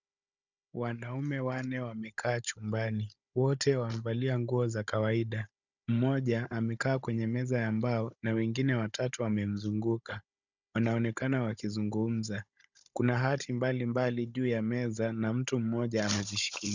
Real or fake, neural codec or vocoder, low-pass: fake; codec, 16 kHz, 16 kbps, FunCodec, trained on Chinese and English, 50 frames a second; 7.2 kHz